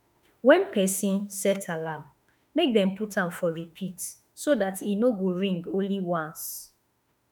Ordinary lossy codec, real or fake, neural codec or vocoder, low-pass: none; fake; autoencoder, 48 kHz, 32 numbers a frame, DAC-VAE, trained on Japanese speech; none